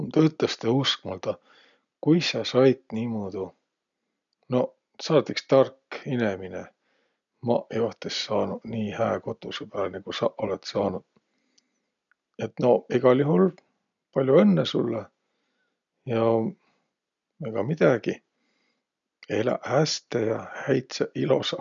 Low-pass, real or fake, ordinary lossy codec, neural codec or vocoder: 7.2 kHz; real; none; none